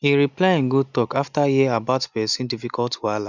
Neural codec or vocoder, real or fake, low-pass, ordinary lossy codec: none; real; 7.2 kHz; none